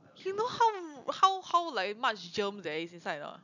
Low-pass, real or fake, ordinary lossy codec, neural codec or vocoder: 7.2 kHz; real; none; none